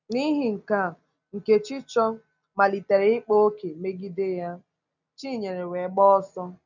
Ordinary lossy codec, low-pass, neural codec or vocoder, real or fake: none; 7.2 kHz; none; real